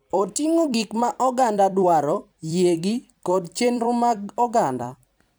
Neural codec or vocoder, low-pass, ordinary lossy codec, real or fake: vocoder, 44.1 kHz, 128 mel bands every 256 samples, BigVGAN v2; none; none; fake